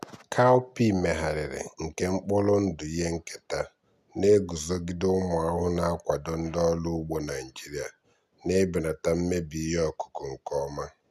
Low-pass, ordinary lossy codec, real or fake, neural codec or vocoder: 14.4 kHz; none; real; none